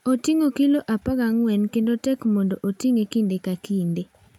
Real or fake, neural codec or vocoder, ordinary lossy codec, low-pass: real; none; none; 19.8 kHz